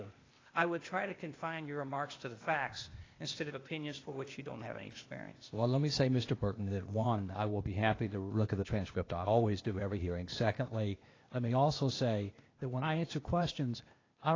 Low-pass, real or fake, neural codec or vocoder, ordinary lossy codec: 7.2 kHz; fake; codec, 16 kHz, 0.8 kbps, ZipCodec; AAC, 32 kbps